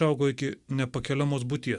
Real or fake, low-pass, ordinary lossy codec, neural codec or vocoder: real; 10.8 kHz; Opus, 64 kbps; none